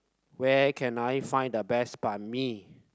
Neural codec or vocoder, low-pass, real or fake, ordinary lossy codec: none; none; real; none